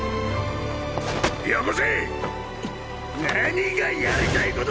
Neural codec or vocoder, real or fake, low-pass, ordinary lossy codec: none; real; none; none